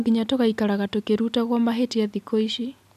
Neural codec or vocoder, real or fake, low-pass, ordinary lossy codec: none; real; 14.4 kHz; none